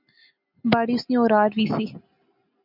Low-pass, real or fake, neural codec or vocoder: 5.4 kHz; real; none